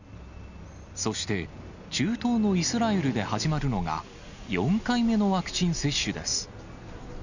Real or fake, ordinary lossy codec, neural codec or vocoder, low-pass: real; none; none; 7.2 kHz